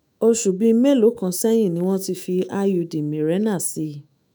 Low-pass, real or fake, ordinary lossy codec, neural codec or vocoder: none; fake; none; autoencoder, 48 kHz, 128 numbers a frame, DAC-VAE, trained on Japanese speech